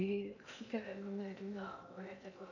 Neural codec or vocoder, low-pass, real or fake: codec, 16 kHz in and 24 kHz out, 0.8 kbps, FocalCodec, streaming, 65536 codes; 7.2 kHz; fake